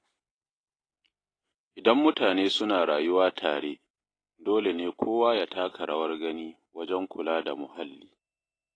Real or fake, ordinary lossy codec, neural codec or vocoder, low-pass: real; AAC, 32 kbps; none; 9.9 kHz